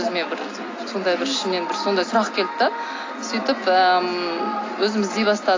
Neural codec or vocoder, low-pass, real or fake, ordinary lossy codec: none; 7.2 kHz; real; AAC, 32 kbps